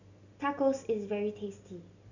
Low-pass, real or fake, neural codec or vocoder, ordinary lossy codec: 7.2 kHz; real; none; none